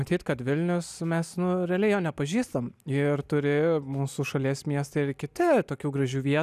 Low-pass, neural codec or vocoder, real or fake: 14.4 kHz; none; real